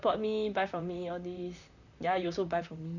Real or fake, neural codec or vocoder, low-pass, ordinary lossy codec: fake; vocoder, 44.1 kHz, 128 mel bands, Pupu-Vocoder; 7.2 kHz; none